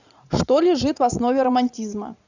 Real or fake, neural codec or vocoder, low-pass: fake; vocoder, 44.1 kHz, 80 mel bands, Vocos; 7.2 kHz